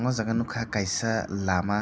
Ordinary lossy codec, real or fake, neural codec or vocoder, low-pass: none; real; none; none